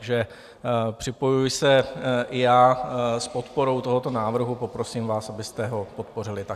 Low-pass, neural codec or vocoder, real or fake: 14.4 kHz; none; real